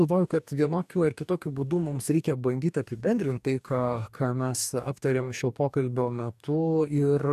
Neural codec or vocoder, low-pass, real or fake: codec, 44.1 kHz, 2.6 kbps, DAC; 14.4 kHz; fake